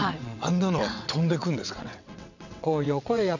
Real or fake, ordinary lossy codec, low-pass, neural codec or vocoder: fake; none; 7.2 kHz; vocoder, 22.05 kHz, 80 mel bands, WaveNeXt